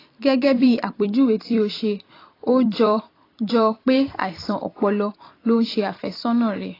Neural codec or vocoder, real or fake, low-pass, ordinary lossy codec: vocoder, 44.1 kHz, 128 mel bands every 256 samples, BigVGAN v2; fake; 5.4 kHz; AAC, 24 kbps